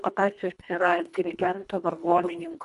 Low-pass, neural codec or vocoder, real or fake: 10.8 kHz; codec, 24 kHz, 1.5 kbps, HILCodec; fake